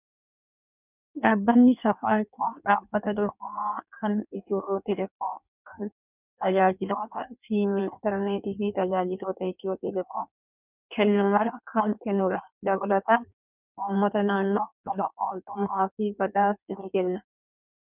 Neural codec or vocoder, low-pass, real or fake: codec, 16 kHz in and 24 kHz out, 1.1 kbps, FireRedTTS-2 codec; 3.6 kHz; fake